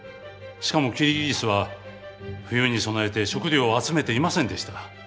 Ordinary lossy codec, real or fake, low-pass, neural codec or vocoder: none; real; none; none